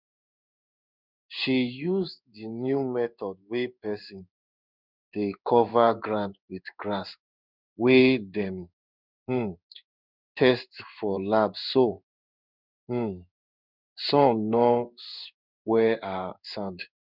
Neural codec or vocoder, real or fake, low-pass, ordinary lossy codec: codec, 16 kHz in and 24 kHz out, 1 kbps, XY-Tokenizer; fake; 5.4 kHz; none